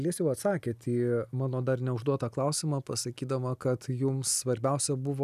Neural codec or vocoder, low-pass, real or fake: none; 14.4 kHz; real